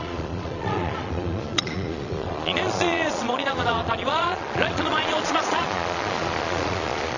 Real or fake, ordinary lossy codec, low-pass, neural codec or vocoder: fake; none; 7.2 kHz; vocoder, 22.05 kHz, 80 mel bands, Vocos